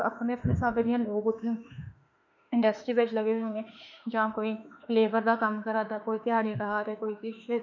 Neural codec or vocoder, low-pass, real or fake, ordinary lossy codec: autoencoder, 48 kHz, 32 numbers a frame, DAC-VAE, trained on Japanese speech; 7.2 kHz; fake; none